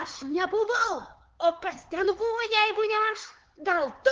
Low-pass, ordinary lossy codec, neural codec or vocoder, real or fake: 7.2 kHz; Opus, 16 kbps; codec, 16 kHz, 4 kbps, X-Codec, HuBERT features, trained on LibriSpeech; fake